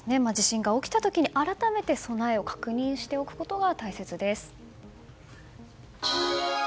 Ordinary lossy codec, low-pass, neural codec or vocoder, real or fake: none; none; none; real